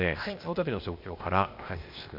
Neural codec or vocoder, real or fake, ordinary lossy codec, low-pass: codec, 16 kHz, 0.8 kbps, ZipCodec; fake; MP3, 48 kbps; 5.4 kHz